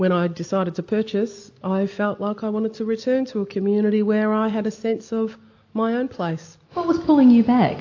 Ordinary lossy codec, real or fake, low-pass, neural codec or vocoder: AAC, 48 kbps; real; 7.2 kHz; none